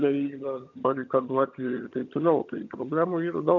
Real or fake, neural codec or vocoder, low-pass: fake; vocoder, 22.05 kHz, 80 mel bands, HiFi-GAN; 7.2 kHz